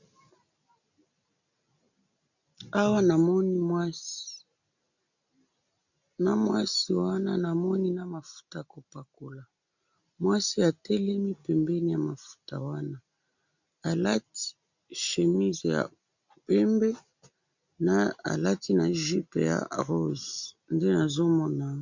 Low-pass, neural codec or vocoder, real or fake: 7.2 kHz; none; real